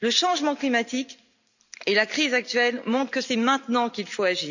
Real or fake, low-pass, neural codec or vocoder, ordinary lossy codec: real; 7.2 kHz; none; none